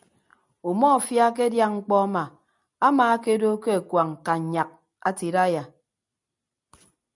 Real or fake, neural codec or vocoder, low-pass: real; none; 10.8 kHz